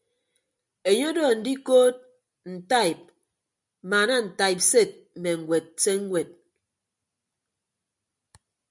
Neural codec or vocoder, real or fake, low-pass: none; real; 10.8 kHz